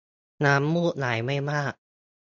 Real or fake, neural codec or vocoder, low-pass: real; none; 7.2 kHz